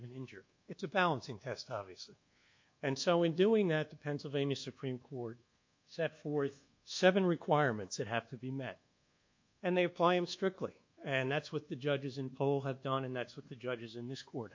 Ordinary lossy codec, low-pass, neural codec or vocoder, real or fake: MP3, 48 kbps; 7.2 kHz; codec, 24 kHz, 1.2 kbps, DualCodec; fake